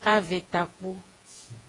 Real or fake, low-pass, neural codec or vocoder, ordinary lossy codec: fake; 10.8 kHz; vocoder, 48 kHz, 128 mel bands, Vocos; AAC, 32 kbps